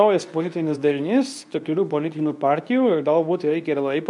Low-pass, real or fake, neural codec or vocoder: 10.8 kHz; fake; codec, 24 kHz, 0.9 kbps, WavTokenizer, medium speech release version 2